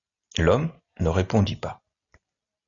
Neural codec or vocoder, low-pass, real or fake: none; 7.2 kHz; real